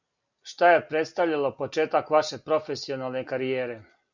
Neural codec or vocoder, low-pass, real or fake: none; 7.2 kHz; real